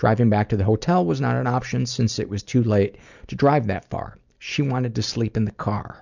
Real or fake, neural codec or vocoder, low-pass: real; none; 7.2 kHz